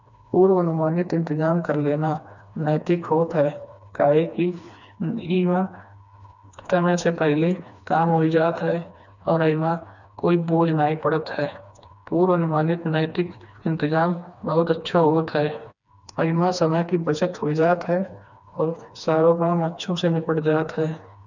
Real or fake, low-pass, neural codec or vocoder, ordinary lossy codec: fake; 7.2 kHz; codec, 16 kHz, 2 kbps, FreqCodec, smaller model; none